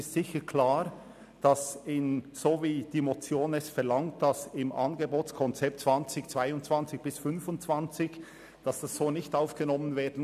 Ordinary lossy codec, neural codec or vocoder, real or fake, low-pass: none; none; real; 14.4 kHz